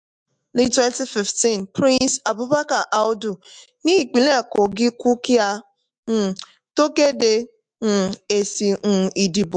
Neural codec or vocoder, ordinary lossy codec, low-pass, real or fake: autoencoder, 48 kHz, 128 numbers a frame, DAC-VAE, trained on Japanese speech; MP3, 64 kbps; 9.9 kHz; fake